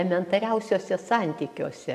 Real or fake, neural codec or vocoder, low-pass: real; none; 14.4 kHz